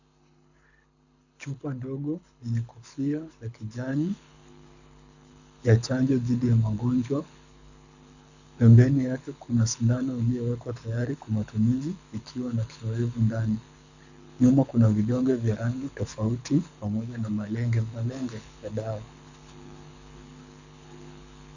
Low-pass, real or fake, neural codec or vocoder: 7.2 kHz; fake; codec, 24 kHz, 6 kbps, HILCodec